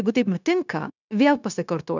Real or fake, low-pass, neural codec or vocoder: fake; 7.2 kHz; codec, 16 kHz, 0.9 kbps, LongCat-Audio-Codec